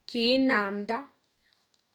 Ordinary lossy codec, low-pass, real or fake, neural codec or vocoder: none; 19.8 kHz; fake; codec, 44.1 kHz, 2.6 kbps, DAC